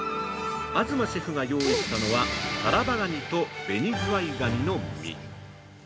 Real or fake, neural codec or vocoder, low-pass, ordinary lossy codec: real; none; none; none